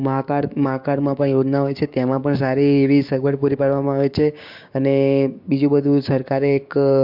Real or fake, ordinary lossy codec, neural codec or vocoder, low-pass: fake; MP3, 48 kbps; codec, 16 kHz, 6 kbps, DAC; 5.4 kHz